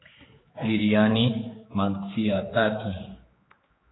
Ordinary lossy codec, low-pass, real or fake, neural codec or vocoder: AAC, 16 kbps; 7.2 kHz; fake; codec, 16 kHz, 4 kbps, X-Codec, HuBERT features, trained on general audio